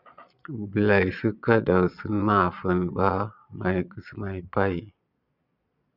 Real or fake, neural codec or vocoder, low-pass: fake; vocoder, 22.05 kHz, 80 mel bands, Vocos; 5.4 kHz